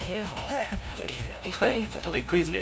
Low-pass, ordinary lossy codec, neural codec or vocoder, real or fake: none; none; codec, 16 kHz, 0.5 kbps, FunCodec, trained on LibriTTS, 25 frames a second; fake